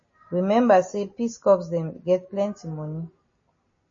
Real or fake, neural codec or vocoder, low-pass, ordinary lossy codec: real; none; 7.2 kHz; MP3, 32 kbps